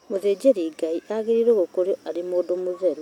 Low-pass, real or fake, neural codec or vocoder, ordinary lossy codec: 19.8 kHz; real; none; none